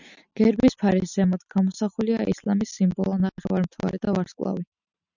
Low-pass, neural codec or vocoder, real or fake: 7.2 kHz; none; real